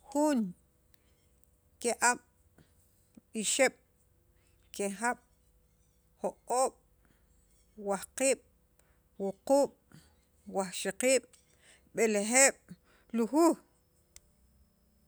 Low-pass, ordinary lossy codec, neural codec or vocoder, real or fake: none; none; none; real